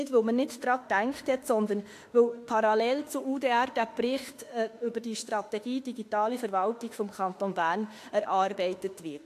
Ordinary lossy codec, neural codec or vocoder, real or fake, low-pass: AAC, 64 kbps; autoencoder, 48 kHz, 32 numbers a frame, DAC-VAE, trained on Japanese speech; fake; 14.4 kHz